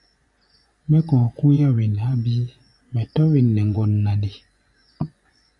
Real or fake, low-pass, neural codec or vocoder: fake; 10.8 kHz; vocoder, 24 kHz, 100 mel bands, Vocos